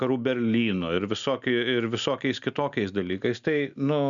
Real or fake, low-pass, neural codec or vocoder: real; 7.2 kHz; none